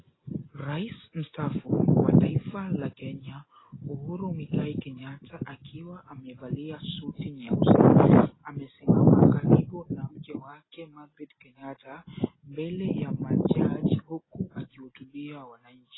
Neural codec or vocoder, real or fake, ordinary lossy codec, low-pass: none; real; AAC, 16 kbps; 7.2 kHz